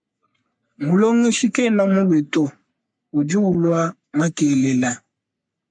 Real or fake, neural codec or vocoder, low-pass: fake; codec, 44.1 kHz, 3.4 kbps, Pupu-Codec; 9.9 kHz